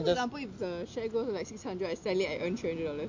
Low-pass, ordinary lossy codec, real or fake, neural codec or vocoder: 7.2 kHz; MP3, 48 kbps; real; none